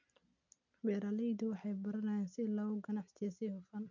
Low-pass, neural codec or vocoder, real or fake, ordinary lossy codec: 7.2 kHz; none; real; none